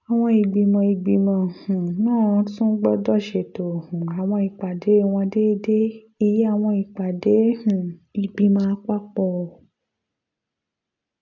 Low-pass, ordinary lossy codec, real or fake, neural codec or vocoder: 7.2 kHz; none; real; none